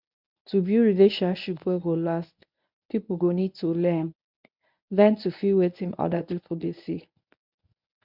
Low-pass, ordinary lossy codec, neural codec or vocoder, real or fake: 5.4 kHz; none; codec, 24 kHz, 0.9 kbps, WavTokenizer, medium speech release version 1; fake